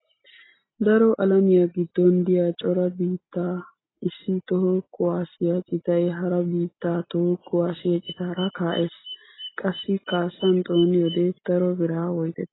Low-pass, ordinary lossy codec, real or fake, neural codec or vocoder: 7.2 kHz; AAC, 16 kbps; real; none